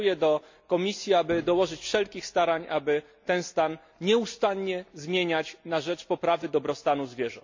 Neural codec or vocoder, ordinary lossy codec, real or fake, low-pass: none; MP3, 48 kbps; real; 7.2 kHz